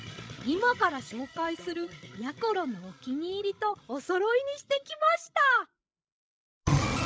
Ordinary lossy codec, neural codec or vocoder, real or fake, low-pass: none; codec, 16 kHz, 16 kbps, FreqCodec, larger model; fake; none